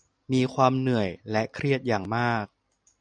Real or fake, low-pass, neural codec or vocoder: real; 9.9 kHz; none